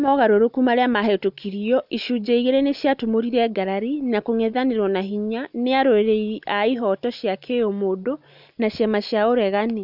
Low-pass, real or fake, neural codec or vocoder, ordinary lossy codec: 5.4 kHz; real; none; none